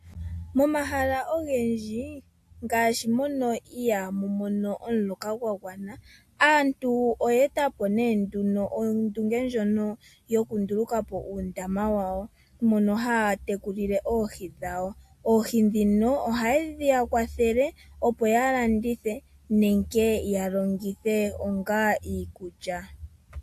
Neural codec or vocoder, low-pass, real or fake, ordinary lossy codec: none; 14.4 kHz; real; AAC, 64 kbps